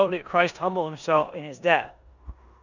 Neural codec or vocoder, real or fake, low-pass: codec, 16 kHz in and 24 kHz out, 0.9 kbps, LongCat-Audio-Codec, four codebook decoder; fake; 7.2 kHz